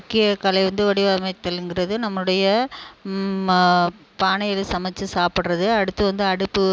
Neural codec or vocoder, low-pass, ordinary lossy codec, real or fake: none; none; none; real